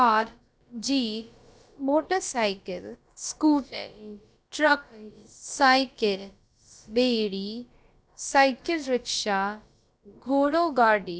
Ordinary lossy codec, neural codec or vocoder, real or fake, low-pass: none; codec, 16 kHz, about 1 kbps, DyCAST, with the encoder's durations; fake; none